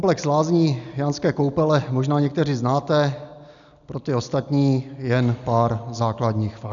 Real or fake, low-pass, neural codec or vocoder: real; 7.2 kHz; none